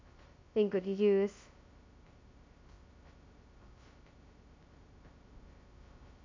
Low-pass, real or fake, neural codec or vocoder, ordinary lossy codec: 7.2 kHz; fake; codec, 16 kHz, 0.2 kbps, FocalCodec; MP3, 48 kbps